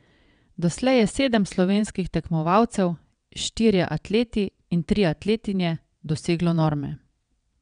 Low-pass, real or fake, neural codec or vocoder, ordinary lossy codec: 9.9 kHz; fake; vocoder, 22.05 kHz, 80 mel bands, WaveNeXt; none